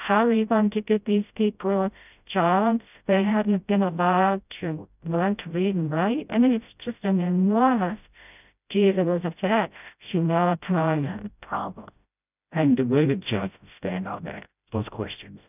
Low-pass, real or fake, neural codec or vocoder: 3.6 kHz; fake; codec, 16 kHz, 0.5 kbps, FreqCodec, smaller model